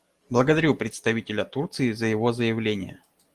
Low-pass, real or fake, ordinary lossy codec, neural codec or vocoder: 14.4 kHz; real; Opus, 24 kbps; none